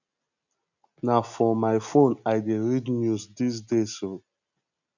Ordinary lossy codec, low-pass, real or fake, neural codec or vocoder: none; 7.2 kHz; real; none